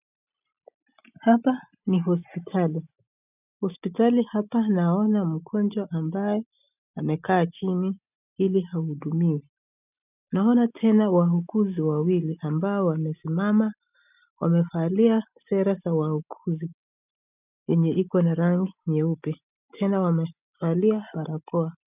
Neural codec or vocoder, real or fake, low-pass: none; real; 3.6 kHz